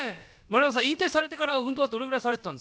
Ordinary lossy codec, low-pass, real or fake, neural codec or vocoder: none; none; fake; codec, 16 kHz, about 1 kbps, DyCAST, with the encoder's durations